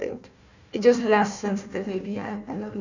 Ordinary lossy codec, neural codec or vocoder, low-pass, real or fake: none; codec, 16 kHz, 1 kbps, FunCodec, trained on Chinese and English, 50 frames a second; 7.2 kHz; fake